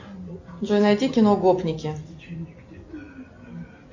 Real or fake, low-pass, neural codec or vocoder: real; 7.2 kHz; none